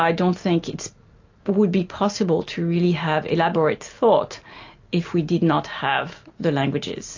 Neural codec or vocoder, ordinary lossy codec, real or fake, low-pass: none; AAC, 48 kbps; real; 7.2 kHz